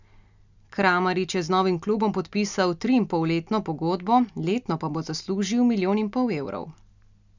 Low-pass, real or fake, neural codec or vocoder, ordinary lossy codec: 7.2 kHz; real; none; none